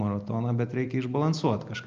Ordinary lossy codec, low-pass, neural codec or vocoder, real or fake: Opus, 24 kbps; 7.2 kHz; none; real